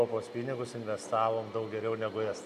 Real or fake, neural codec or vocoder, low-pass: real; none; 14.4 kHz